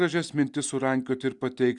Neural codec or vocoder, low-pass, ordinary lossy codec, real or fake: none; 10.8 kHz; Opus, 64 kbps; real